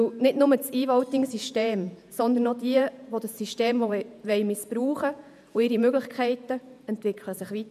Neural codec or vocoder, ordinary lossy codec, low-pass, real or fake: vocoder, 48 kHz, 128 mel bands, Vocos; none; 14.4 kHz; fake